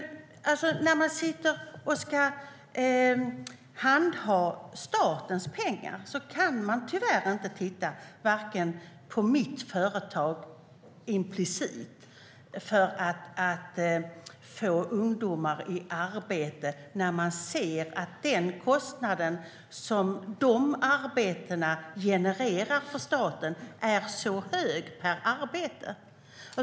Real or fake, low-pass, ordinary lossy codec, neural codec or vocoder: real; none; none; none